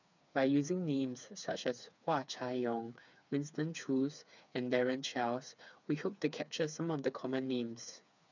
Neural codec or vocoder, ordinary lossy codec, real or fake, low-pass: codec, 16 kHz, 4 kbps, FreqCodec, smaller model; none; fake; 7.2 kHz